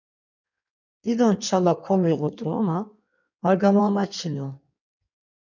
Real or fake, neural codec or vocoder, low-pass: fake; codec, 16 kHz in and 24 kHz out, 1.1 kbps, FireRedTTS-2 codec; 7.2 kHz